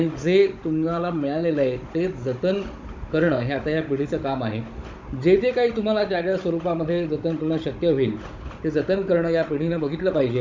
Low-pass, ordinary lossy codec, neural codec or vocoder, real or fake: 7.2 kHz; MP3, 48 kbps; codec, 16 kHz, 16 kbps, FunCodec, trained on Chinese and English, 50 frames a second; fake